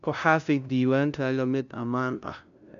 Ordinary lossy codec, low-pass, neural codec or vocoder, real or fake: none; 7.2 kHz; codec, 16 kHz, 0.5 kbps, FunCodec, trained on LibriTTS, 25 frames a second; fake